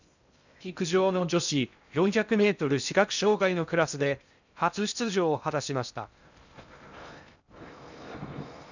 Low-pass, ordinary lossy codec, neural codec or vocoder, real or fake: 7.2 kHz; none; codec, 16 kHz in and 24 kHz out, 0.6 kbps, FocalCodec, streaming, 2048 codes; fake